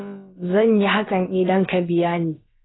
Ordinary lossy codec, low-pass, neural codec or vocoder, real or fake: AAC, 16 kbps; 7.2 kHz; codec, 16 kHz, about 1 kbps, DyCAST, with the encoder's durations; fake